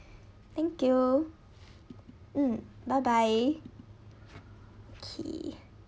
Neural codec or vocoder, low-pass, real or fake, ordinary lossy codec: none; none; real; none